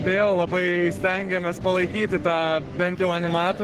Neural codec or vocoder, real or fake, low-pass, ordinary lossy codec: codec, 44.1 kHz, 3.4 kbps, Pupu-Codec; fake; 14.4 kHz; Opus, 16 kbps